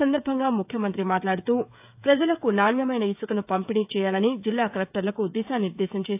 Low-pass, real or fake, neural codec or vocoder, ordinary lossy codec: 3.6 kHz; fake; codec, 16 kHz, 16 kbps, FreqCodec, smaller model; AAC, 32 kbps